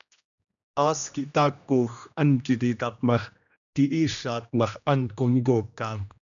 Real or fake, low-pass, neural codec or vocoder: fake; 7.2 kHz; codec, 16 kHz, 1 kbps, X-Codec, HuBERT features, trained on general audio